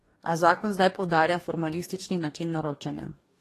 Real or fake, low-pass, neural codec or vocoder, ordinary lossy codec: fake; 14.4 kHz; codec, 44.1 kHz, 2.6 kbps, DAC; AAC, 48 kbps